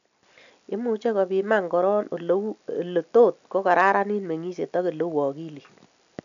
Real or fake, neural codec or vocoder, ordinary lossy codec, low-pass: real; none; none; 7.2 kHz